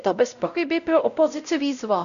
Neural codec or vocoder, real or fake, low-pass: codec, 16 kHz, 0.5 kbps, X-Codec, WavLM features, trained on Multilingual LibriSpeech; fake; 7.2 kHz